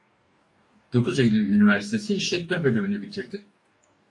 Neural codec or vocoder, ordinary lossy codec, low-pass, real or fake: codec, 44.1 kHz, 2.6 kbps, DAC; AAC, 48 kbps; 10.8 kHz; fake